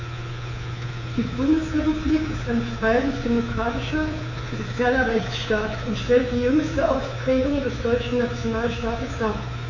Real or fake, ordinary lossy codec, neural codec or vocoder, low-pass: fake; none; codec, 24 kHz, 3.1 kbps, DualCodec; 7.2 kHz